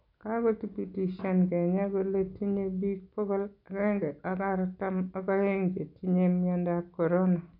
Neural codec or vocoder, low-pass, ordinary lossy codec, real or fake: none; 5.4 kHz; none; real